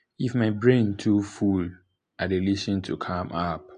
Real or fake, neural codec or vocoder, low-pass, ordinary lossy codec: real; none; 9.9 kHz; AAC, 64 kbps